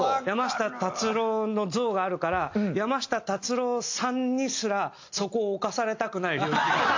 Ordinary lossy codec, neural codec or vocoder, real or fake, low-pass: AAC, 48 kbps; none; real; 7.2 kHz